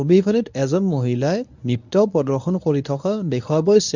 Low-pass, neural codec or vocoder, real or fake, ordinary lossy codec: 7.2 kHz; codec, 24 kHz, 0.9 kbps, WavTokenizer, medium speech release version 1; fake; none